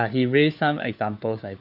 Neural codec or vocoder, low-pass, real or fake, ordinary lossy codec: codec, 16 kHz, 4 kbps, FunCodec, trained on LibriTTS, 50 frames a second; 5.4 kHz; fake; none